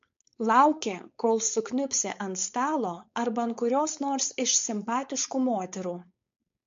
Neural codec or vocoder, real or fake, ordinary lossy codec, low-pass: codec, 16 kHz, 4.8 kbps, FACodec; fake; MP3, 48 kbps; 7.2 kHz